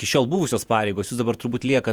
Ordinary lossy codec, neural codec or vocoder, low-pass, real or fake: Opus, 64 kbps; vocoder, 48 kHz, 128 mel bands, Vocos; 19.8 kHz; fake